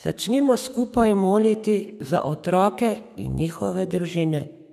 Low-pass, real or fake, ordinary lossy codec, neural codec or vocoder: 14.4 kHz; fake; none; codec, 32 kHz, 1.9 kbps, SNAC